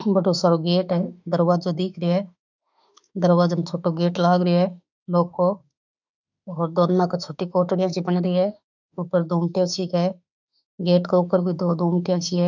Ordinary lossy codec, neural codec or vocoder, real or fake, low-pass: none; autoencoder, 48 kHz, 32 numbers a frame, DAC-VAE, trained on Japanese speech; fake; 7.2 kHz